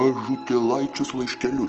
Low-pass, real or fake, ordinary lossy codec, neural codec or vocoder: 7.2 kHz; real; Opus, 16 kbps; none